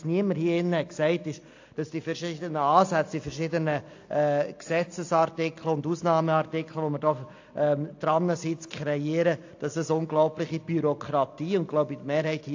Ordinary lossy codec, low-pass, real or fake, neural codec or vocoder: AAC, 48 kbps; 7.2 kHz; real; none